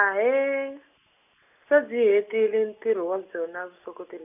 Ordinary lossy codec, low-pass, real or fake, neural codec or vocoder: none; 3.6 kHz; real; none